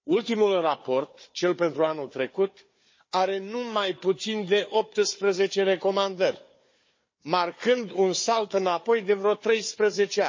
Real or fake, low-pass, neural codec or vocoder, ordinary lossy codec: fake; 7.2 kHz; codec, 16 kHz, 4 kbps, FunCodec, trained on Chinese and English, 50 frames a second; MP3, 32 kbps